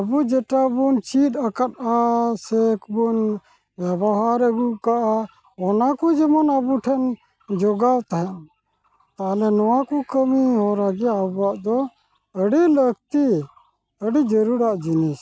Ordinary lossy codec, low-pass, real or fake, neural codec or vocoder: none; none; real; none